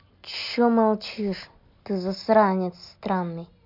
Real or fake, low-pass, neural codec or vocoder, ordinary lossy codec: real; 5.4 kHz; none; MP3, 48 kbps